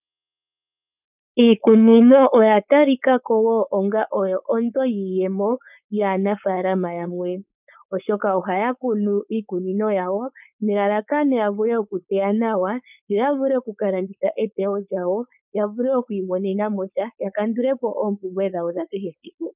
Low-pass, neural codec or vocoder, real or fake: 3.6 kHz; codec, 16 kHz, 4.8 kbps, FACodec; fake